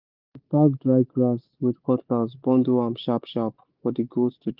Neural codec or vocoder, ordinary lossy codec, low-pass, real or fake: none; Opus, 24 kbps; 5.4 kHz; real